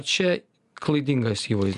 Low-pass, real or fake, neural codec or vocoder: 10.8 kHz; real; none